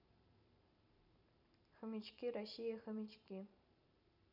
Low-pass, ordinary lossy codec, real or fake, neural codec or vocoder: 5.4 kHz; none; fake; vocoder, 44.1 kHz, 128 mel bands every 512 samples, BigVGAN v2